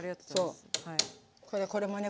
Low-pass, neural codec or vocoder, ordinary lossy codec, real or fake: none; none; none; real